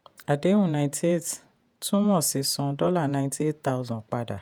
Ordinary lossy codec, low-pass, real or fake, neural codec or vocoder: none; none; fake; vocoder, 48 kHz, 128 mel bands, Vocos